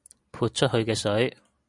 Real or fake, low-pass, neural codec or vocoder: real; 10.8 kHz; none